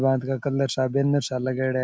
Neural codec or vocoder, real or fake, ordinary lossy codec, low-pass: none; real; none; none